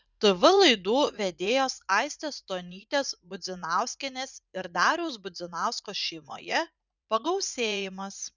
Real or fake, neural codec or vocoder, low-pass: fake; vocoder, 44.1 kHz, 80 mel bands, Vocos; 7.2 kHz